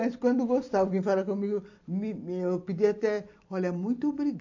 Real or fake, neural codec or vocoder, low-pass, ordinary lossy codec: real; none; 7.2 kHz; AAC, 48 kbps